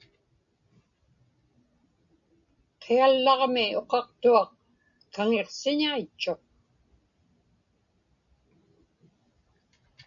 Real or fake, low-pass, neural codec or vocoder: real; 7.2 kHz; none